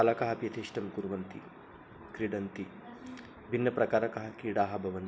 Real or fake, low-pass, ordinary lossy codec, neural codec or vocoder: real; none; none; none